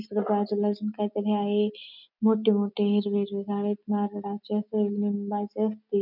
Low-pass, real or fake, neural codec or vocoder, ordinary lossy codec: 5.4 kHz; real; none; none